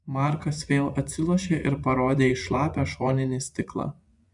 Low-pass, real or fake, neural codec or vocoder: 10.8 kHz; fake; vocoder, 24 kHz, 100 mel bands, Vocos